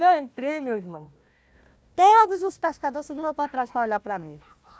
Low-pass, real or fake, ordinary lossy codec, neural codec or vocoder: none; fake; none; codec, 16 kHz, 1 kbps, FunCodec, trained on Chinese and English, 50 frames a second